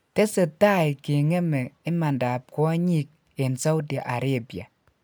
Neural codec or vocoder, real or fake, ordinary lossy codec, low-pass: none; real; none; none